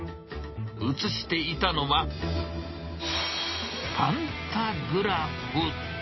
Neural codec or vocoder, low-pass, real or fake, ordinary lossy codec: none; 7.2 kHz; real; MP3, 24 kbps